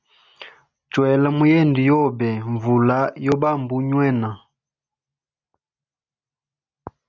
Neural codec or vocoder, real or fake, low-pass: none; real; 7.2 kHz